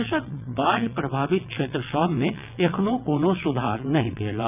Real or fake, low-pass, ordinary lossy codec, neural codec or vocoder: fake; 3.6 kHz; none; vocoder, 22.05 kHz, 80 mel bands, WaveNeXt